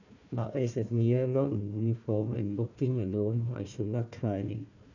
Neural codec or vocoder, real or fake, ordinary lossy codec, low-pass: codec, 16 kHz, 1 kbps, FunCodec, trained on Chinese and English, 50 frames a second; fake; none; 7.2 kHz